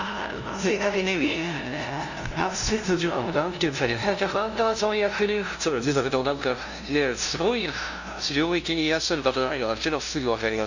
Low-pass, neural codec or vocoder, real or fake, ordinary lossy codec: 7.2 kHz; codec, 16 kHz, 0.5 kbps, FunCodec, trained on LibriTTS, 25 frames a second; fake; none